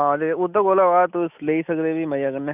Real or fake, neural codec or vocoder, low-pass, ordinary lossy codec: real; none; 3.6 kHz; none